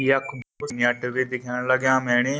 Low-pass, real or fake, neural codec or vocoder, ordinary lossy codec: none; real; none; none